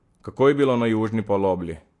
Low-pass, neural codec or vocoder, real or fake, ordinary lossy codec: 10.8 kHz; vocoder, 44.1 kHz, 128 mel bands every 256 samples, BigVGAN v2; fake; AAC, 48 kbps